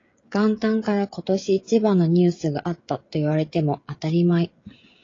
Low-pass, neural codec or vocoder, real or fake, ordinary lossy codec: 7.2 kHz; codec, 16 kHz, 16 kbps, FreqCodec, smaller model; fake; AAC, 32 kbps